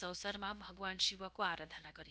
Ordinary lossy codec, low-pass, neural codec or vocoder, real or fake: none; none; codec, 16 kHz, about 1 kbps, DyCAST, with the encoder's durations; fake